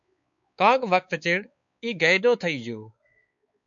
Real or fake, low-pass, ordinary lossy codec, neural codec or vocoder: fake; 7.2 kHz; MP3, 64 kbps; codec, 16 kHz, 4 kbps, X-Codec, WavLM features, trained on Multilingual LibriSpeech